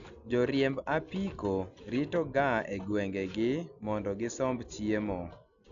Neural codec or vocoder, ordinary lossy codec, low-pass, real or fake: none; none; 7.2 kHz; real